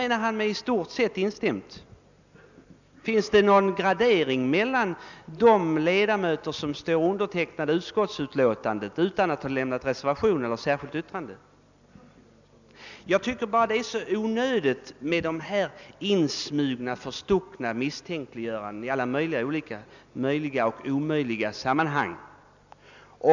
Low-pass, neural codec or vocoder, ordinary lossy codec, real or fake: 7.2 kHz; none; none; real